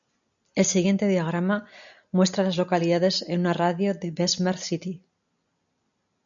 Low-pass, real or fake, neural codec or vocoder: 7.2 kHz; real; none